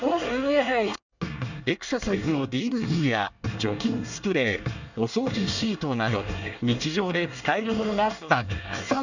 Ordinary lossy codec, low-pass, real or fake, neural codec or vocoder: none; 7.2 kHz; fake; codec, 24 kHz, 1 kbps, SNAC